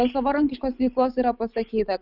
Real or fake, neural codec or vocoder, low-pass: real; none; 5.4 kHz